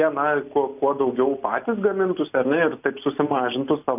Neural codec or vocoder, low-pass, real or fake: none; 3.6 kHz; real